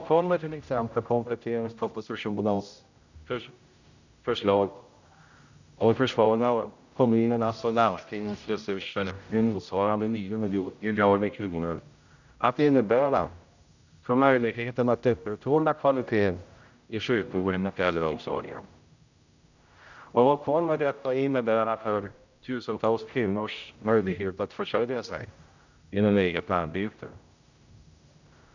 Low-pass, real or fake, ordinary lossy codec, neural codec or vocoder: 7.2 kHz; fake; none; codec, 16 kHz, 0.5 kbps, X-Codec, HuBERT features, trained on general audio